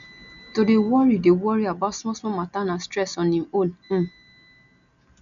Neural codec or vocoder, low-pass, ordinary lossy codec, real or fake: none; 7.2 kHz; none; real